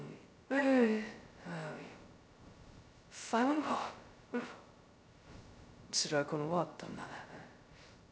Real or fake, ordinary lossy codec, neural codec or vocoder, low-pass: fake; none; codec, 16 kHz, 0.2 kbps, FocalCodec; none